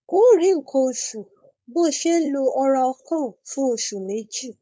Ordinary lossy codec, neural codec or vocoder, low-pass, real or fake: none; codec, 16 kHz, 4.8 kbps, FACodec; none; fake